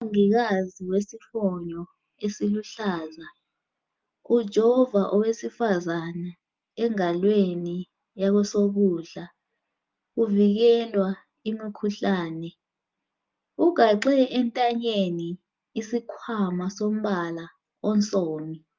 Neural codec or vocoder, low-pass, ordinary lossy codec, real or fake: none; 7.2 kHz; Opus, 24 kbps; real